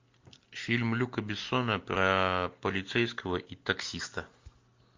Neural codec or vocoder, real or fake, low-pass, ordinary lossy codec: none; real; 7.2 kHz; MP3, 48 kbps